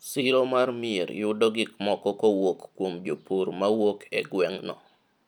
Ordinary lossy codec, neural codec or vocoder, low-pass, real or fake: none; none; 19.8 kHz; real